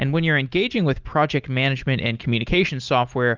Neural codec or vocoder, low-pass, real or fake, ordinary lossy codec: none; 7.2 kHz; real; Opus, 32 kbps